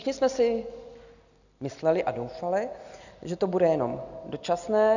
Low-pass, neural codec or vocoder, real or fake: 7.2 kHz; none; real